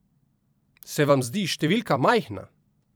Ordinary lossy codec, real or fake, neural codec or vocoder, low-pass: none; fake; vocoder, 44.1 kHz, 128 mel bands every 256 samples, BigVGAN v2; none